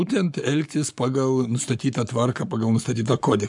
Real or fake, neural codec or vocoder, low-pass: fake; codec, 44.1 kHz, 7.8 kbps, Pupu-Codec; 10.8 kHz